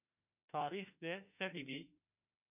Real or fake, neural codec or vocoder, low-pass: fake; autoencoder, 48 kHz, 32 numbers a frame, DAC-VAE, trained on Japanese speech; 3.6 kHz